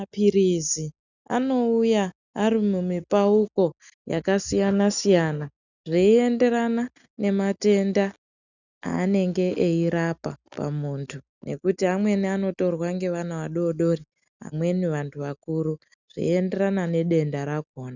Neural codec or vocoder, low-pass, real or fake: none; 7.2 kHz; real